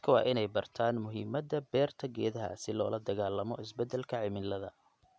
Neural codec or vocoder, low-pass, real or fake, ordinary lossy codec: none; none; real; none